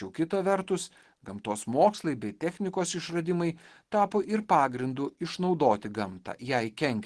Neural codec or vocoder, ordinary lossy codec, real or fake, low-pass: none; Opus, 16 kbps; real; 10.8 kHz